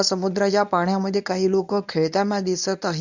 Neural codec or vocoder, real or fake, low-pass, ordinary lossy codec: codec, 24 kHz, 0.9 kbps, WavTokenizer, medium speech release version 1; fake; 7.2 kHz; none